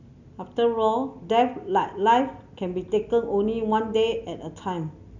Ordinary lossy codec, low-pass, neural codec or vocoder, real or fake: none; 7.2 kHz; none; real